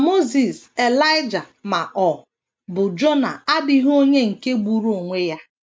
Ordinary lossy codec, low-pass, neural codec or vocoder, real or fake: none; none; none; real